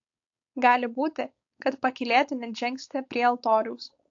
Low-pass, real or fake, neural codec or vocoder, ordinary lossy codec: 7.2 kHz; fake; codec, 16 kHz, 4.8 kbps, FACodec; AAC, 48 kbps